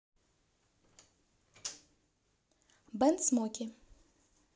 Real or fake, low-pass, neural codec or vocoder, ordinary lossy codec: real; none; none; none